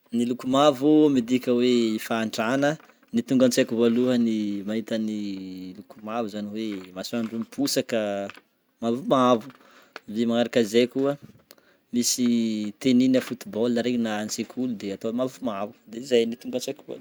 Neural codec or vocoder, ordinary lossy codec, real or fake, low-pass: none; none; real; none